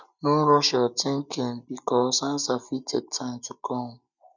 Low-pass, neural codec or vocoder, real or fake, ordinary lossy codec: 7.2 kHz; none; real; none